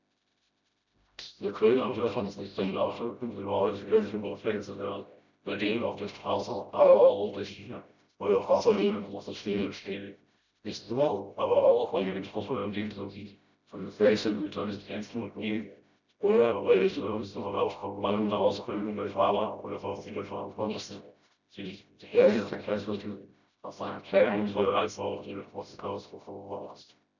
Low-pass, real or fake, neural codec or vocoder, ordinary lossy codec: 7.2 kHz; fake; codec, 16 kHz, 0.5 kbps, FreqCodec, smaller model; none